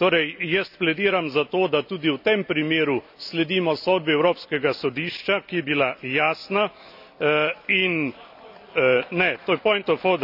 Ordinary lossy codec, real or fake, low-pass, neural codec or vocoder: none; real; 5.4 kHz; none